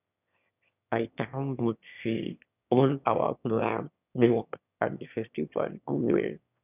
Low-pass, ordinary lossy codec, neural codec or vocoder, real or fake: 3.6 kHz; none; autoencoder, 22.05 kHz, a latent of 192 numbers a frame, VITS, trained on one speaker; fake